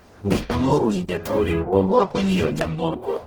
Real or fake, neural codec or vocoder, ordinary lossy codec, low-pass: fake; codec, 44.1 kHz, 0.9 kbps, DAC; Opus, 16 kbps; 19.8 kHz